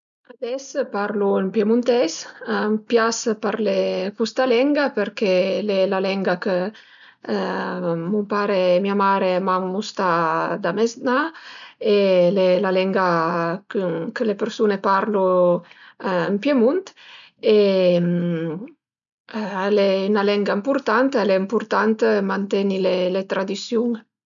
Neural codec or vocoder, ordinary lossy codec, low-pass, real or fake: none; none; 7.2 kHz; real